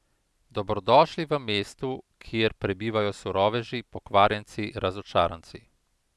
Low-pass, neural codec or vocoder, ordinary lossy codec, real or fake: none; none; none; real